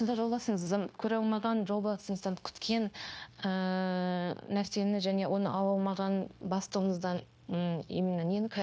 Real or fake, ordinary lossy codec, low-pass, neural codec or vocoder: fake; none; none; codec, 16 kHz, 0.9 kbps, LongCat-Audio-Codec